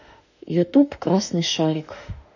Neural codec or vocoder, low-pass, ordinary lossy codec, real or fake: autoencoder, 48 kHz, 32 numbers a frame, DAC-VAE, trained on Japanese speech; 7.2 kHz; none; fake